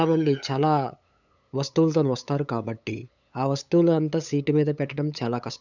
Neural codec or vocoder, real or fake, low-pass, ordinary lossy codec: codec, 16 kHz, 8 kbps, FunCodec, trained on LibriTTS, 25 frames a second; fake; 7.2 kHz; none